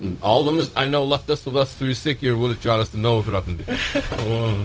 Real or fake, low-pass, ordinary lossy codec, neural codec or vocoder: fake; none; none; codec, 16 kHz, 0.4 kbps, LongCat-Audio-Codec